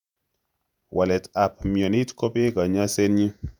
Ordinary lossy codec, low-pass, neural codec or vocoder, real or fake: none; 19.8 kHz; vocoder, 48 kHz, 128 mel bands, Vocos; fake